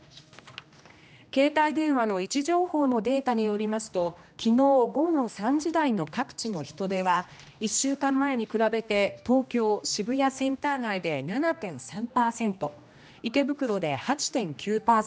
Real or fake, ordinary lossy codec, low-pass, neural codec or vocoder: fake; none; none; codec, 16 kHz, 1 kbps, X-Codec, HuBERT features, trained on general audio